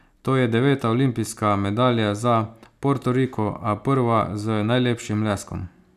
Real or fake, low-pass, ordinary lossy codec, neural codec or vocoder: real; 14.4 kHz; none; none